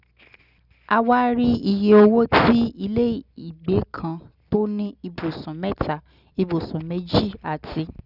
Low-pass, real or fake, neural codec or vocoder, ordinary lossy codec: 5.4 kHz; real; none; none